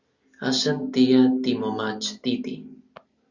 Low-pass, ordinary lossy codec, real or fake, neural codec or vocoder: 7.2 kHz; Opus, 64 kbps; real; none